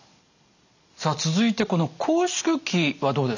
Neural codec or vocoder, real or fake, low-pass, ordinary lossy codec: none; real; 7.2 kHz; none